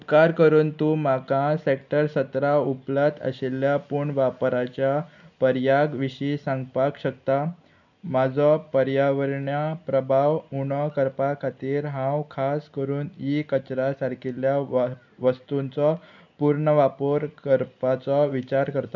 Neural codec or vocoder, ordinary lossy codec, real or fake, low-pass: none; none; real; 7.2 kHz